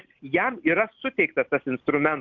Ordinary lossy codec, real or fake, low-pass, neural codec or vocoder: Opus, 24 kbps; real; 7.2 kHz; none